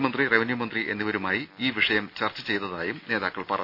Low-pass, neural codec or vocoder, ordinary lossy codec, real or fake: 5.4 kHz; none; none; real